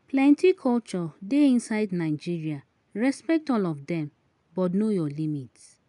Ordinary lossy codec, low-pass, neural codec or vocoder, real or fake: none; 10.8 kHz; none; real